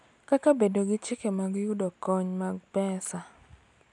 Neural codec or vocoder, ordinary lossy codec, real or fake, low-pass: none; none; real; 10.8 kHz